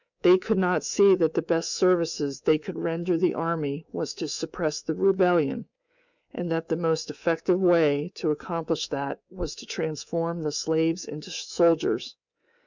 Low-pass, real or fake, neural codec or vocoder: 7.2 kHz; fake; codec, 24 kHz, 3.1 kbps, DualCodec